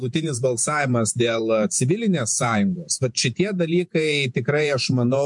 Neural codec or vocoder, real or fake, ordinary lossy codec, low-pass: vocoder, 48 kHz, 128 mel bands, Vocos; fake; MP3, 64 kbps; 10.8 kHz